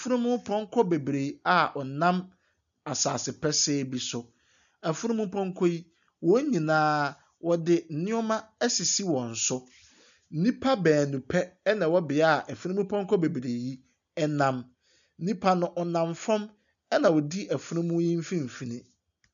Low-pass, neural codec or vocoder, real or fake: 7.2 kHz; none; real